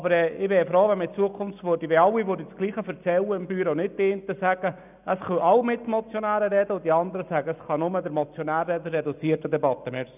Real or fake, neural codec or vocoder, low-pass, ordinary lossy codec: real; none; 3.6 kHz; none